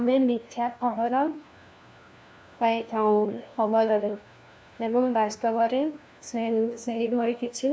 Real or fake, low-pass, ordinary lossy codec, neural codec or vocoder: fake; none; none; codec, 16 kHz, 1 kbps, FunCodec, trained on LibriTTS, 50 frames a second